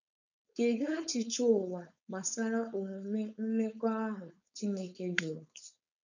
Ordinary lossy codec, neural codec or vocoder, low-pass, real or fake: none; codec, 16 kHz, 4.8 kbps, FACodec; 7.2 kHz; fake